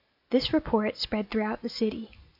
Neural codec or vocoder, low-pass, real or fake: none; 5.4 kHz; real